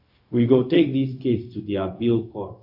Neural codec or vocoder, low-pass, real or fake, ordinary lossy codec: codec, 16 kHz, 0.4 kbps, LongCat-Audio-Codec; 5.4 kHz; fake; AAC, 48 kbps